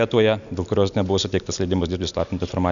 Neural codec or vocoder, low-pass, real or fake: none; 7.2 kHz; real